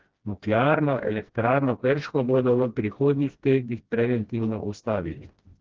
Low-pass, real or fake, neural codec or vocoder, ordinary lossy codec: 7.2 kHz; fake; codec, 16 kHz, 1 kbps, FreqCodec, smaller model; Opus, 16 kbps